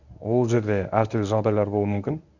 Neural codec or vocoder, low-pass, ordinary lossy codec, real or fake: codec, 24 kHz, 0.9 kbps, WavTokenizer, medium speech release version 1; 7.2 kHz; none; fake